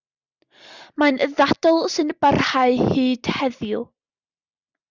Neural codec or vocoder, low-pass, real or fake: none; 7.2 kHz; real